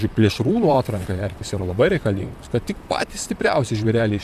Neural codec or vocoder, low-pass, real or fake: vocoder, 44.1 kHz, 128 mel bands, Pupu-Vocoder; 14.4 kHz; fake